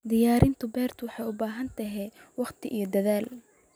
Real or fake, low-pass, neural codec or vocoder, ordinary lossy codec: real; none; none; none